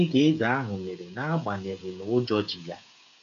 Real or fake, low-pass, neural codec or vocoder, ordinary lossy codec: fake; 7.2 kHz; codec, 16 kHz, 8 kbps, FreqCodec, smaller model; none